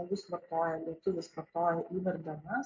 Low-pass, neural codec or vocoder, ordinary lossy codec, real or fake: 7.2 kHz; none; MP3, 48 kbps; real